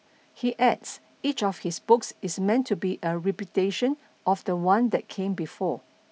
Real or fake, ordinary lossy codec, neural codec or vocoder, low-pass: real; none; none; none